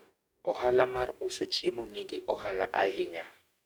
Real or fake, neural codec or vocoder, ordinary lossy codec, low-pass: fake; codec, 44.1 kHz, 2.6 kbps, DAC; none; none